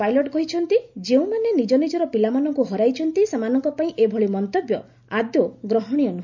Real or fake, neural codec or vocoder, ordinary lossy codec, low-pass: real; none; none; 7.2 kHz